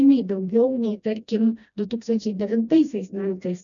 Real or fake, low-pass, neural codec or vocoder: fake; 7.2 kHz; codec, 16 kHz, 1 kbps, FreqCodec, smaller model